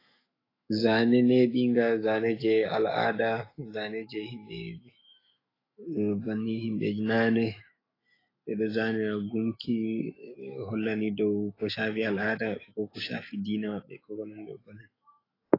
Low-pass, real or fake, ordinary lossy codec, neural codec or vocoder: 5.4 kHz; fake; AAC, 24 kbps; autoencoder, 48 kHz, 128 numbers a frame, DAC-VAE, trained on Japanese speech